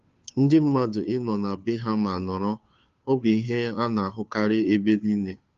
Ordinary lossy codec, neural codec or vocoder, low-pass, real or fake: Opus, 32 kbps; codec, 16 kHz, 2 kbps, FunCodec, trained on Chinese and English, 25 frames a second; 7.2 kHz; fake